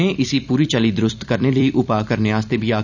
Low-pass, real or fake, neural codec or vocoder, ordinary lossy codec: 7.2 kHz; fake; vocoder, 44.1 kHz, 128 mel bands every 256 samples, BigVGAN v2; none